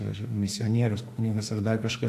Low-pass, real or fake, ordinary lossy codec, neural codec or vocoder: 14.4 kHz; fake; AAC, 64 kbps; autoencoder, 48 kHz, 32 numbers a frame, DAC-VAE, trained on Japanese speech